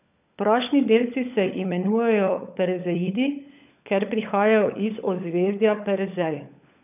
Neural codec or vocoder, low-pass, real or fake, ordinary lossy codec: codec, 16 kHz, 16 kbps, FunCodec, trained on LibriTTS, 50 frames a second; 3.6 kHz; fake; none